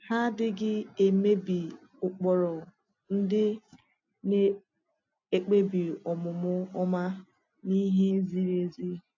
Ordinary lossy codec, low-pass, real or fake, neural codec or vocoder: AAC, 48 kbps; 7.2 kHz; real; none